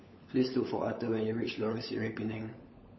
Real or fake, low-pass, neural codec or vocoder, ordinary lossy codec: fake; 7.2 kHz; codec, 16 kHz, 16 kbps, FunCodec, trained on LibriTTS, 50 frames a second; MP3, 24 kbps